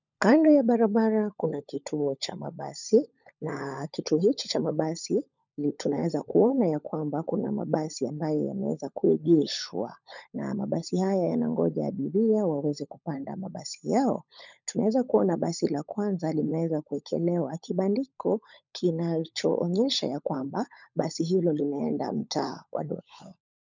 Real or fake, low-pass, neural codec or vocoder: fake; 7.2 kHz; codec, 16 kHz, 16 kbps, FunCodec, trained on LibriTTS, 50 frames a second